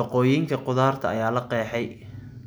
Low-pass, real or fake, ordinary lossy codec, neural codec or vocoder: none; real; none; none